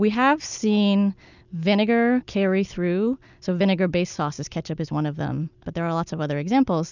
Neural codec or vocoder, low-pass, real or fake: none; 7.2 kHz; real